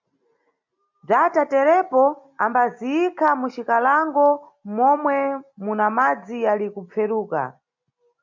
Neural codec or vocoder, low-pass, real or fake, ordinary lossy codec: none; 7.2 kHz; real; MP3, 48 kbps